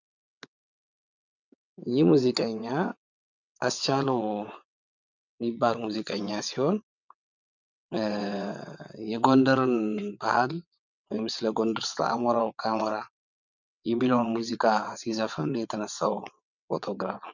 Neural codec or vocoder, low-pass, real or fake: vocoder, 22.05 kHz, 80 mel bands, WaveNeXt; 7.2 kHz; fake